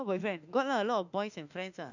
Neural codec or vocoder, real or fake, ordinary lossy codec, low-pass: autoencoder, 48 kHz, 32 numbers a frame, DAC-VAE, trained on Japanese speech; fake; none; 7.2 kHz